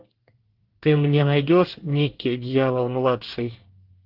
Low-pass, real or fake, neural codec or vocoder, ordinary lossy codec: 5.4 kHz; fake; codec, 24 kHz, 1 kbps, SNAC; Opus, 16 kbps